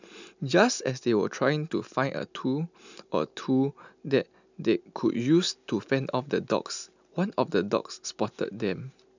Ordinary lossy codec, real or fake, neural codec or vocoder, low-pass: none; real; none; 7.2 kHz